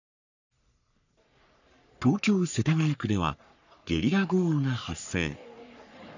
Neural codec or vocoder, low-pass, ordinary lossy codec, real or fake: codec, 44.1 kHz, 3.4 kbps, Pupu-Codec; 7.2 kHz; none; fake